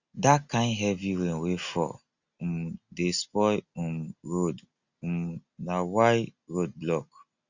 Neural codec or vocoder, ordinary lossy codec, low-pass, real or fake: none; Opus, 64 kbps; 7.2 kHz; real